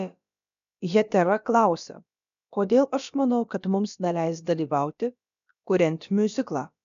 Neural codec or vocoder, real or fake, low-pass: codec, 16 kHz, about 1 kbps, DyCAST, with the encoder's durations; fake; 7.2 kHz